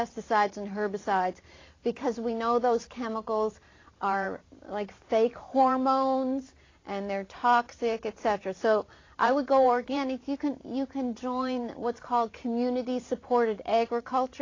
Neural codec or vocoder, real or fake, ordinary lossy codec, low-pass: vocoder, 44.1 kHz, 128 mel bands every 512 samples, BigVGAN v2; fake; AAC, 32 kbps; 7.2 kHz